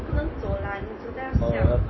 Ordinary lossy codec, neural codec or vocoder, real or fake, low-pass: MP3, 24 kbps; none; real; 7.2 kHz